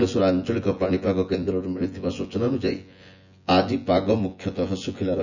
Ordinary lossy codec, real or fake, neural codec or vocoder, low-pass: none; fake; vocoder, 24 kHz, 100 mel bands, Vocos; 7.2 kHz